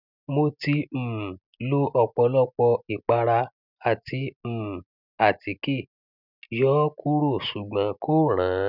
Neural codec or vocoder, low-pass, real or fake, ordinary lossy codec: none; 5.4 kHz; real; none